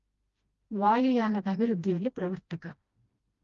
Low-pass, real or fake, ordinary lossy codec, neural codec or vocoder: 7.2 kHz; fake; Opus, 32 kbps; codec, 16 kHz, 1 kbps, FreqCodec, smaller model